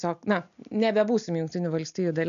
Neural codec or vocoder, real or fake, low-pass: none; real; 7.2 kHz